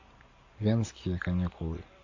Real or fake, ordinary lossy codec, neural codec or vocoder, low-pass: real; AAC, 48 kbps; none; 7.2 kHz